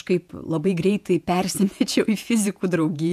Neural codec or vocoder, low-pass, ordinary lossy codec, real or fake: vocoder, 48 kHz, 128 mel bands, Vocos; 14.4 kHz; MP3, 96 kbps; fake